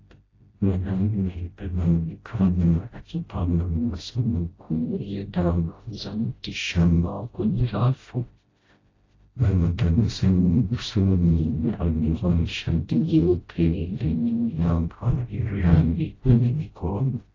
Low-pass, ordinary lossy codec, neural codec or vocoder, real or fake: 7.2 kHz; AAC, 32 kbps; codec, 16 kHz, 0.5 kbps, FreqCodec, smaller model; fake